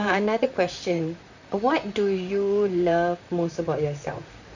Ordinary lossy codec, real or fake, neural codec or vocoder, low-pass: none; fake; vocoder, 44.1 kHz, 128 mel bands, Pupu-Vocoder; 7.2 kHz